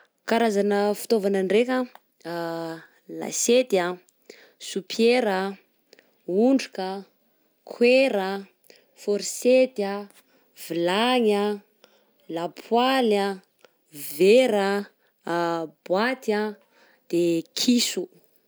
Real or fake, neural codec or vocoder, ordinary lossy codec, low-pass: real; none; none; none